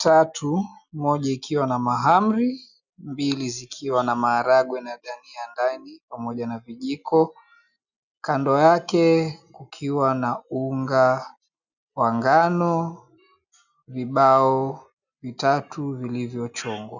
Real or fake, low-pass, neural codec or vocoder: real; 7.2 kHz; none